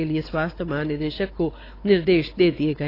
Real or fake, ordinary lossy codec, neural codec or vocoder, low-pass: fake; AAC, 24 kbps; codec, 16 kHz, 4 kbps, X-Codec, WavLM features, trained on Multilingual LibriSpeech; 5.4 kHz